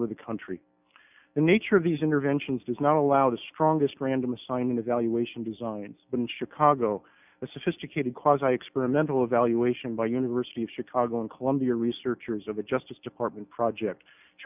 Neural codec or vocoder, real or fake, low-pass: none; real; 3.6 kHz